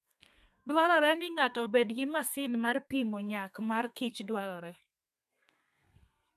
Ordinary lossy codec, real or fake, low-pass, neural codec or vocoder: none; fake; 14.4 kHz; codec, 32 kHz, 1.9 kbps, SNAC